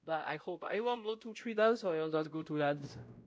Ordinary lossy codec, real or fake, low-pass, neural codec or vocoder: none; fake; none; codec, 16 kHz, 0.5 kbps, X-Codec, WavLM features, trained on Multilingual LibriSpeech